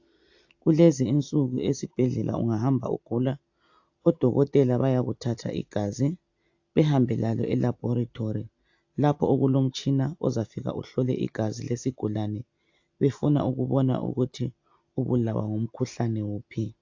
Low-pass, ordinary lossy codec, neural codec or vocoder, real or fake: 7.2 kHz; AAC, 48 kbps; none; real